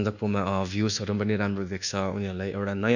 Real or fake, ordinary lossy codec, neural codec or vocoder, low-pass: fake; none; codec, 24 kHz, 1.2 kbps, DualCodec; 7.2 kHz